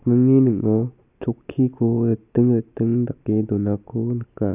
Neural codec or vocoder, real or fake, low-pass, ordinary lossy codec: none; real; 3.6 kHz; none